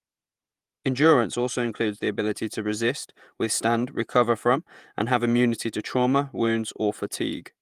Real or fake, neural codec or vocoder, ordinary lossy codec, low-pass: fake; vocoder, 48 kHz, 128 mel bands, Vocos; Opus, 32 kbps; 14.4 kHz